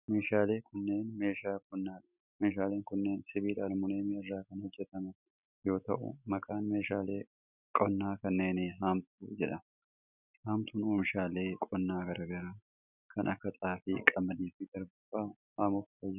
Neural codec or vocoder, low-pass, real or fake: none; 3.6 kHz; real